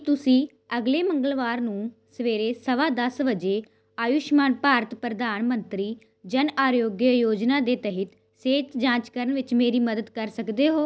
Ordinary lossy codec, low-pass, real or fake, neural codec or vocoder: none; none; real; none